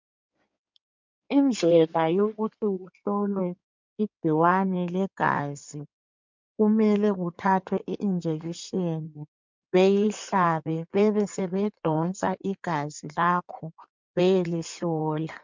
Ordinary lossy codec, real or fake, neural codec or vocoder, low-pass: MP3, 64 kbps; fake; codec, 16 kHz in and 24 kHz out, 2.2 kbps, FireRedTTS-2 codec; 7.2 kHz